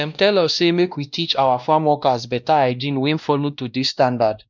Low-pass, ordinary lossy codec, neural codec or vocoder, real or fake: 7.2 kHz; none; codec, 16 kHz, 1 kbps, X-Codec, WavLM features, trained on Multilingual LibriSpeech; fake